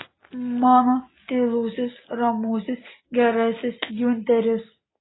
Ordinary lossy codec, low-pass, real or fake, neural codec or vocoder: AAC, 16 kbps; 7.2 kHz; real; none